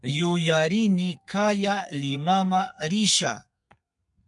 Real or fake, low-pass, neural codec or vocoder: fake; 10.8 kHz; codec, 32 kHz, 1.9 kbps, SNAC